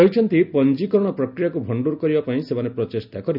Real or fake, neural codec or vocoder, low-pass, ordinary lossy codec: real; none; 5.4 kHz; none